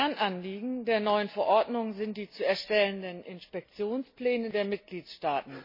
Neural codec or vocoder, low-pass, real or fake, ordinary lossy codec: none; 5.4 kHz; real; MP3, 24 kbps